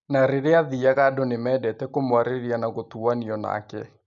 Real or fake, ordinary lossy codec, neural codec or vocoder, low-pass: real; none; none; 7.2 kHz